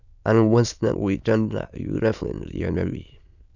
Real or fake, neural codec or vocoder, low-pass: fake; autoencoder, 22.05 kHz, a latent of 192 numbers a frame, VITS, trained on many speakers; 7.2 kHz